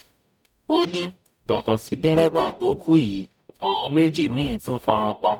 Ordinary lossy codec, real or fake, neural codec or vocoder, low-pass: none; fake; codec, 44.1 kHz, 0.9 kbps, DAC; 19.8 kHz